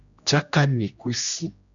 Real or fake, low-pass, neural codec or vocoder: fake; 7.2 kHz; codec, 16 kHz, 1 kbps, X-Codec, HuBERT features, trained on general audio